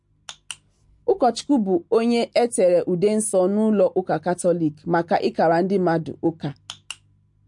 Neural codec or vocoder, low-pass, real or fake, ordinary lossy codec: none; 10.8 kHz; real; MP3, 48 kbps